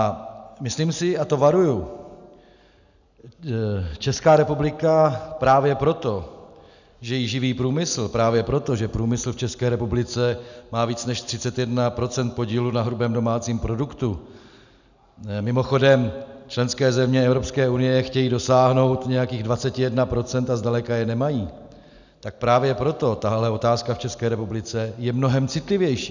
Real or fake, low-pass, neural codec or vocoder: real; 7.2 kHz; none